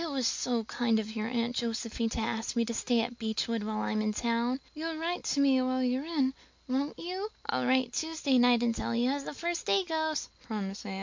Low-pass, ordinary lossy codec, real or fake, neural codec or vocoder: 7.2 kHz; MP3, 64 kbps; real; none